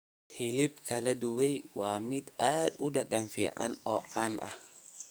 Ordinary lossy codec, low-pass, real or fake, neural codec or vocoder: none; none; fake; codec, 44.1 kHz, 2.6 kbps, SNAC